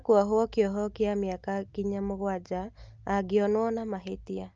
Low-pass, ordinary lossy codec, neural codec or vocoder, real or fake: 7.2 kHz; Opus, 24 kbps; none; real